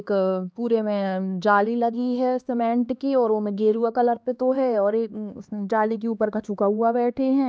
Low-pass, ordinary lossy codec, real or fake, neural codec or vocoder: none; none; fake; codec, 16 kHz, 2 kbps, X-Codec, HuBERT features, trained on LibriSpeech